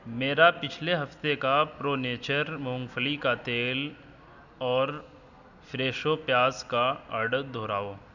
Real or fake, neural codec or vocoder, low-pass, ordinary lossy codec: real; none; 7.2 kHz; none